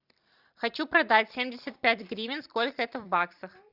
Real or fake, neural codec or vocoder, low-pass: fake; vocoder, 44.1 kHz, 128 mel bands every 256 samples, BigVGAN v2; 5.4 kHz